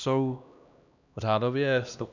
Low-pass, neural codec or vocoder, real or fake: 7.2 kHz; codec, 16 kHz, 1 kbps, X-Codec, HuBERT features, trained on LibriSpeech; fake